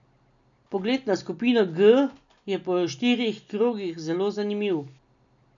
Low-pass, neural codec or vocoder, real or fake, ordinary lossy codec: 7.2 kHz; none; real; none